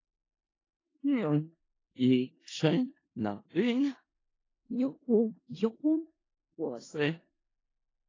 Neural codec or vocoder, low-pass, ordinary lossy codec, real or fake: codec, 16 kHz in and 24 kHz out, 0.4 kbps, LongCat-Audio-Codec, four codebook decoder; 7.2 kHz; AAC, 32 kbps; fake